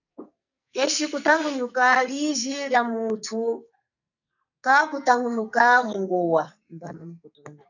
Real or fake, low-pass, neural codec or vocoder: fake; 7.2 kHz; codec, 44.1 kHz, 2.6 kbps, SNAC